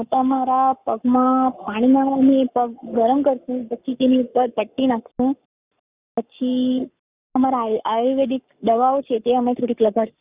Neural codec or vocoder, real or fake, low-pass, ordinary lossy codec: codec, 44.1 kHz, 7.8 kbps, Pupu-Codec; fake; 3.6 kHz; Opus, 64 kbps